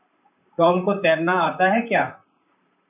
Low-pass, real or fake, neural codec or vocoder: 3.6 kHz; fake; vocoder, 44.1 kHz, 128 mel bands, Pupu-Vocoder